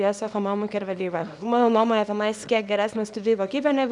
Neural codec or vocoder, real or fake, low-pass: codec, 24 kHz, 0.9 kbps, WavTokenizer, small release; fake; 10.8 kHz